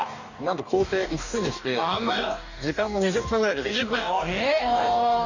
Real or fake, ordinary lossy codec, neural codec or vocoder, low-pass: fake; none; codec, 44.1 kHz, 2.6 kbps, DAC; 7.2 kHz